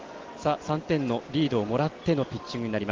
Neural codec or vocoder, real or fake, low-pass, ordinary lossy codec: none; real; 7.2 kHz; Opus, 16 kbps